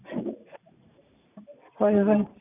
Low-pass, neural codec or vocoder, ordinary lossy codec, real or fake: 3.6 kHz; none; none; real